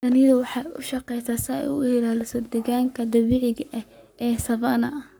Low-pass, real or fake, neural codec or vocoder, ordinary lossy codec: none; fake; codec, 44.1 kHz, 7.8 kbps, Pupu-Codec; none